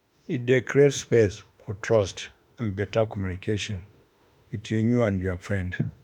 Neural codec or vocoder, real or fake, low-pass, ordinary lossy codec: autoencoder, 48 kHz, 32 numbers a frame, DAC-VAE, trained on Japanese speech; fake; 19.8 kHz; none